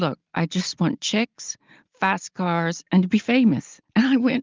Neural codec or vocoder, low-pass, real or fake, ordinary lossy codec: none; 7.2 kHz; real; Opus, 32 kbps